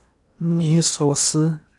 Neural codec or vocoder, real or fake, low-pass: codec, 16 kHz in and 24 kHz out, 0.8 kbps, FocalCodec, streaming, 65536 codes; fake; 10.8 kHz